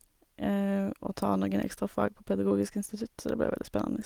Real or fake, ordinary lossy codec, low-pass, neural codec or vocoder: real; Opus, 24 kbps; 19.8 kHz; none